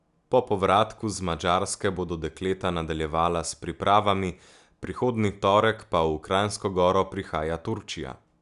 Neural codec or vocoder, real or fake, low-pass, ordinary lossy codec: none; real; 10.8 kHz; none